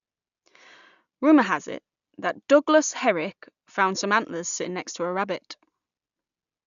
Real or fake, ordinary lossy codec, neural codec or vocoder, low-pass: real; none; none; 7.2 kHz